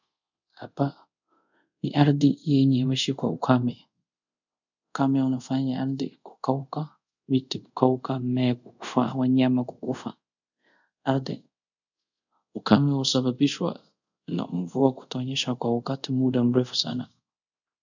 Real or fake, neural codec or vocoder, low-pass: fake; codec, 24 kHz, 0.5 kbps, DualCodec; 7.2 kHz